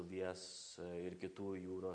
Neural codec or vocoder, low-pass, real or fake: none; 9.9 kHz; real